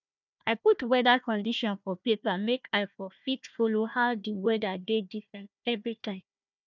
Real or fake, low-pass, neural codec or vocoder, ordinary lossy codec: fake; 7.2 kHz; codec, 16 kHz, 1 kbps, FunCodec, trained on Chinese and English, 50 frames a second; none